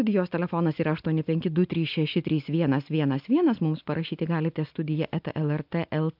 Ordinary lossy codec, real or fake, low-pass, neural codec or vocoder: AAC, 48 kbps; real; 5.4 kHz; none